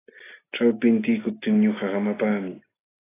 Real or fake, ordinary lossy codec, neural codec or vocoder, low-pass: real; AAC, 16 kbps; none; 3.6 kHz